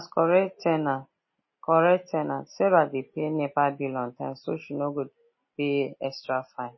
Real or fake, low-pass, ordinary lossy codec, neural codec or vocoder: real; 7.2 kHz; MP3, 24 kbps; none